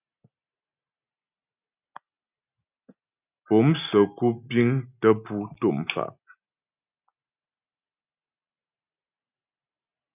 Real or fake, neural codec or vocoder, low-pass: real; none; 3.6 kHz